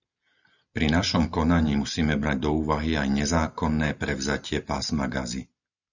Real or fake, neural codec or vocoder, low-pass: real; none; 7.2 kHz